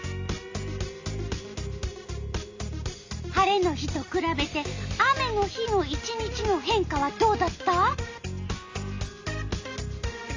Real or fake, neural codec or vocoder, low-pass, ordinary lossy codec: real; none; 7.2 kHz; AAC, 48 kbps